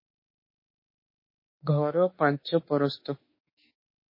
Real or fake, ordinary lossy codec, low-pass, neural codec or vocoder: fake; MP3, 24 kbps; 5.4 kHz; autoencoder, 48 kHz, 32 numbers a frame, DAC-VAE, trained on Japanese speech